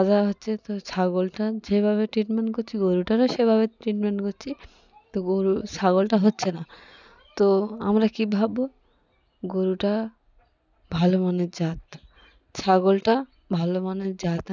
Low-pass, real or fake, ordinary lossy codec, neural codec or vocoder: 7.2 kHz; real; none; none